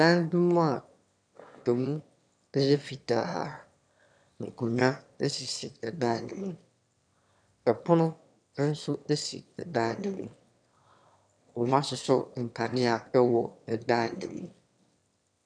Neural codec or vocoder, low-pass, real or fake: autoencoder, 22.05 kHz, a latent of 192 numbers a frame, VITS, trained on one speaker; 9.9 kHz; fake